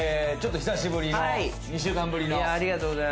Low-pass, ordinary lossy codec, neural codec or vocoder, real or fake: none; none; none; real